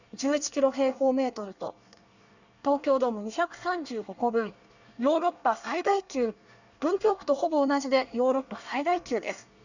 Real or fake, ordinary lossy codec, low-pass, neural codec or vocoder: fake; none; 7.2 kHz; codec, 24 kHz, 1 kbps, SNAC